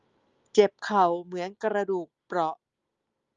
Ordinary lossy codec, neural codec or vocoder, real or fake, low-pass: Opus, 32 kbps; none; real; 7.2 kHz